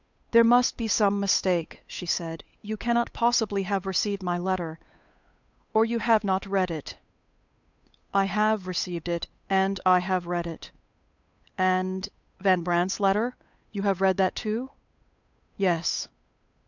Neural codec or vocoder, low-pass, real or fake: codec, 16 kHz, 8 kbps, FunCodec, trained on Chinese and English, 25 frames a second; 7.2 kHz; fake